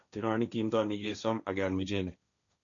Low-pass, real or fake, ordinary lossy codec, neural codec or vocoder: 7.2 kHz; fake; none; codec, 16 kHz, 1.1 kbps, Voila-Tokenizer